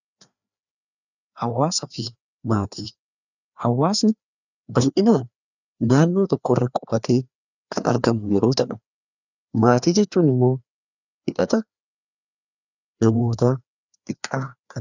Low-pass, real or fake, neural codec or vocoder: 7.2 kHz; fake; codec, 16 kHz, 2 kbps, FreqCodec, larger model